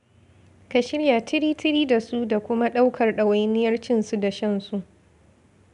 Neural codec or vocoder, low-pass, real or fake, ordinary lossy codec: none; 10.8 kHz; real; none